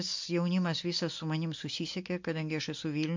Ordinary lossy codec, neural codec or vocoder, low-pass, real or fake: MP3, 48 kbps; none; 7.2 kHz; real